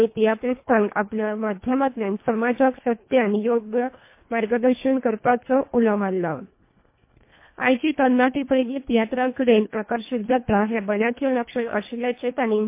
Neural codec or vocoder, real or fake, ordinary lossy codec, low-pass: codec, 24 kHz, 1.5 kbps, HILCodec; fake; MP3, 24 kbps; 3.6 kHz